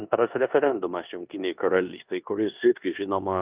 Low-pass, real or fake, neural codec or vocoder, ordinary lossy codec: 3.6 kHz; fake; codec, 16 kHz in and 24 kHz out, 0.9 kbps, LongCat-Audio-Codec, four codebook decoder; Opus, 24 kbps